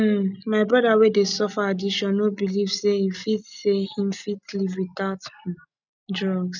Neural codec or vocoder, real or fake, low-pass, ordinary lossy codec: none; real; 7.2 kHz; none